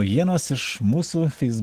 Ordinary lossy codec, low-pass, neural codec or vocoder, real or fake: Opus, 16 kbps; 14.4 kHz; none; real